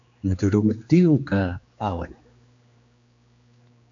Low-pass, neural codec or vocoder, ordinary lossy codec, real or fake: 7.2 kHz; codec, 16 kHz, 2 kbps, X-Codec, HuBERT features, trained on general audio; AAC, 64 kbps; fake